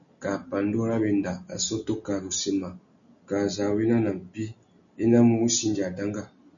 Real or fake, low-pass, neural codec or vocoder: real; 7.2 kHz; none